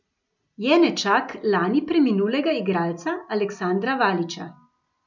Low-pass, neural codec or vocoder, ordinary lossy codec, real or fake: 7.2 kHz; none; none; real